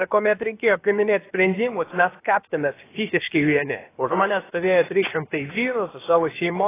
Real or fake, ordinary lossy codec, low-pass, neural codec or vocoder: fake; AAC, 16 kbps; 3.6 kHz; codec, 16 kHz, 0.7 kbps, FocalCodec